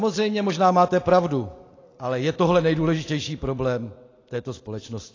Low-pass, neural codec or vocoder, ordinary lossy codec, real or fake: 7.2 kHz; none; AAC, 32 kbps; real